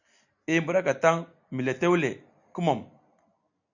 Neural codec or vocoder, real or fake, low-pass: none; real; 7.2 kHz